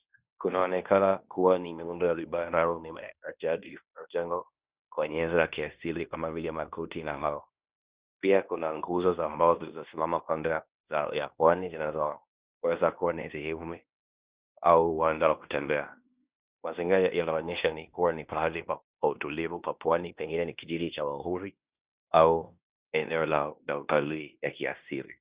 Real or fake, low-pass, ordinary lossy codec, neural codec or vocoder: fake; 3.6 kHz; Opus, 64 kbps; codec, 16 kHz in and 24 kHz out, 0.9 kbps, LongCat-Audio-Codec, fine tuned four codebook decoder